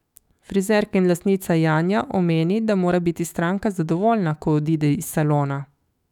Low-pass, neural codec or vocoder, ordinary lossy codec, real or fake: 19.8 kHz; autoencoder, 48 kHz, 128 numbers a frame, DAC-VAE, trained on Japanese speech; none; fake